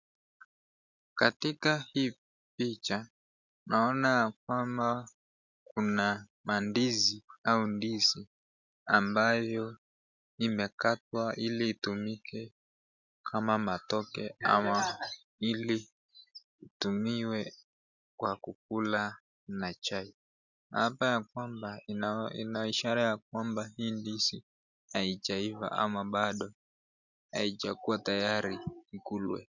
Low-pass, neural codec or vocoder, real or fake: 7.2 kHz; none; real